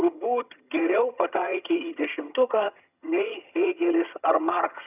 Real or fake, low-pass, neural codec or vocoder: fake; 3.6 kHz; vocoder, 22.05 kHz, 80 mel bands, HiFi-GAN